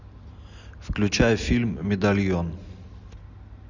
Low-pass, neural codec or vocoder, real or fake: 7.2 kHz; none; real